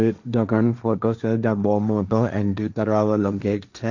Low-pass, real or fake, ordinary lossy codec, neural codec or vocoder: 7.2 kHz; fake; none; codec, 16 kHz, 1.1 kbps, Voila-Tokenizer